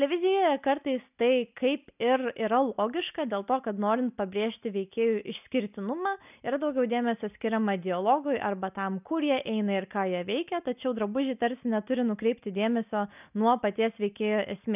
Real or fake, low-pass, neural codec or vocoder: real; 3.6 kHz; none